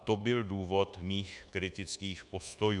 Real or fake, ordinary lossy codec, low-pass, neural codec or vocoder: fake; MP3, 96 kbps; 10.8 kHz; codec, 24 kHz, 1.2 kbps, DualCodec